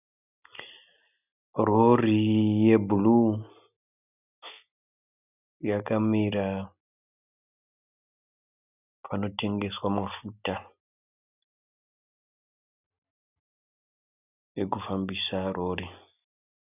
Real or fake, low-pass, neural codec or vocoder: real; 3.6 kHz; none